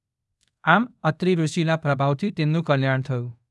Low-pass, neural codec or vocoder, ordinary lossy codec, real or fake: none; codec, 24 kHz, 0.5 kbps, DualCodec; none; fake